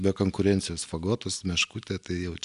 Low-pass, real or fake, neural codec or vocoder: 10.8 kHz; real; none